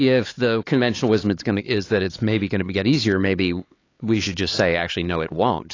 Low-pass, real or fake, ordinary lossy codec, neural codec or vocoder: 7.2 kHz; fake; AAC, 32 kbps; codec, 16 kHz, 4 kbps, X-Codec, HuBERT features, trained on LibriSpeech